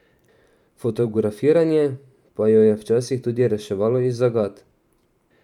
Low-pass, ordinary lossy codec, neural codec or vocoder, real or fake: 19.8 kHz; none; vocoder, 44.1 kHz, 128 mel bands every 512 samples, BigVGAN v2; fake